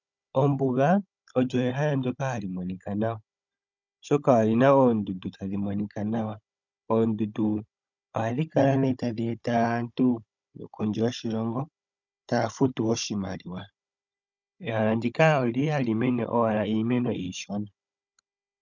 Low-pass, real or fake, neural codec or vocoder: 7.2 kHz; fake; codec, 16 kHz, 16 kbps, FunCodec, trained on Chinese and English, 50 frames a second